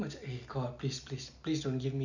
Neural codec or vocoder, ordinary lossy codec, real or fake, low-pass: none; none; real; 7.2 kHz